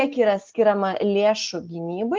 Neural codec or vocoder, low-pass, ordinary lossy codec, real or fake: none; 7.2 kHz; Opus, 16 kbps; real